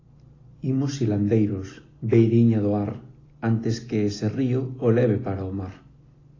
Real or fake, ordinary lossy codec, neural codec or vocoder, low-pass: real; AAC, 32 kbps; none; 7.2 kHz